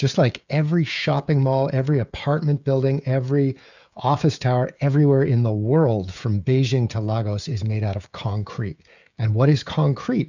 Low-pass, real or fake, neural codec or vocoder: 7.2 kHz; fake; codec, 16 kHz, 16 kbps, FreqCodec, smaller model